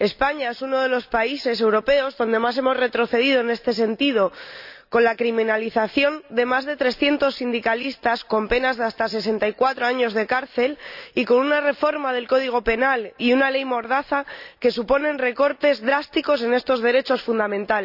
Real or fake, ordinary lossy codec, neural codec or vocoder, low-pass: real; none; none; 5.4 kHz